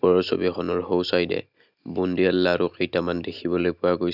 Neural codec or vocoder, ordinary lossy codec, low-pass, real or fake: none; none; 5.4 kHz; real